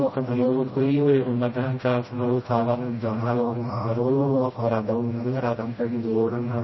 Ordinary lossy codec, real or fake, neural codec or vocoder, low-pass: MP3, 24 kbps; fake; codec, 16 kHz, 0.5 kbps, FreqCodec, smaller model; 7.2 kHz